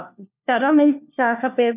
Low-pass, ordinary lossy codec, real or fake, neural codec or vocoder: 3.6 kHz; none; fake; codec, 16 kHz, 1 kbps, FunCodec, trained on LibriTTS, 50 frames a second